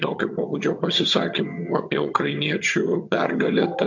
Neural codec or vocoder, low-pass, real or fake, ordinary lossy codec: vocoder, 22.05 kHz, 80 mel bands, HiFi-GAN; 7.2 kHz; fake; MP3, 48 kbps